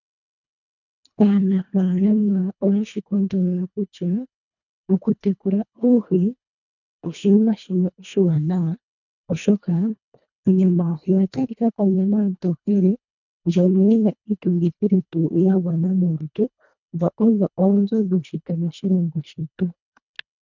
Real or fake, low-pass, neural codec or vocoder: fake; 7.2 kHz; codec, 24 kHz, 1.5 kbps, HILCodec